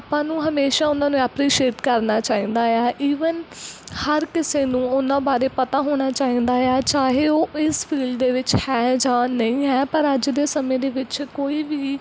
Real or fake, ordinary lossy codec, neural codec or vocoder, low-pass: real; none; none; none